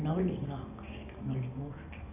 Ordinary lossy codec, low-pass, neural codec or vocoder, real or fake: none; 3.6 kHz; none; real